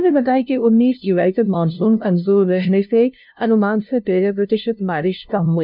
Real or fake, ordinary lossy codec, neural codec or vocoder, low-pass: fake; none; codec, 16 kHz, 0.5 kbps, FunCodec, trained on LibriTTS, 25 frames a second; 5.4 kHz